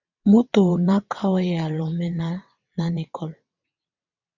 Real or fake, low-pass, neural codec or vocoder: fake; 7.2 kHz; vocoder, 22.05 kHz, 80 mel bands, WaveNeXt